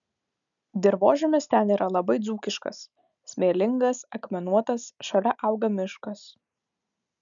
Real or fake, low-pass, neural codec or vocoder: real; 7.2 kHz; none